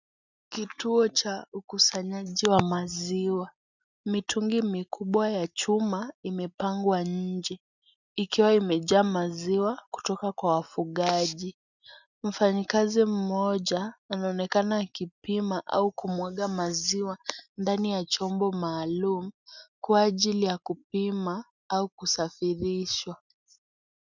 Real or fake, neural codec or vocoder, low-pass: real; none; 7.2 kHz